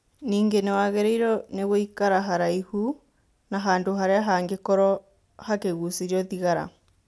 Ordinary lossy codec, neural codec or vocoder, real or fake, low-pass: none; none; real; none